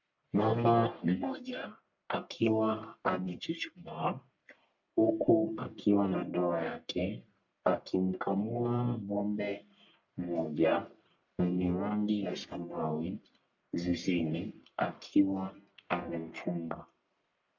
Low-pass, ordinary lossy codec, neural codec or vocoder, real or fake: 7.2 kHz; MP3, 64 kbps; codec, 44.1 kHz, 1.7 kbps, Pupu-Codec; fake